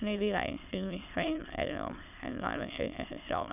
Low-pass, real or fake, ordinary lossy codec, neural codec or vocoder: 3.6 kHz; fake; none; autoencoder, 22.05 kHz, a latent of 192 numbers a frame, VITS, trained on many speakers